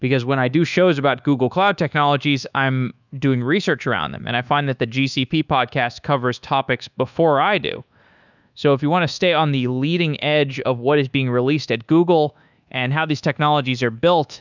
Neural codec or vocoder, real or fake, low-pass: codec, 24 kHz, 1.2 kbps, DualCodec; fake; 7.2 kHz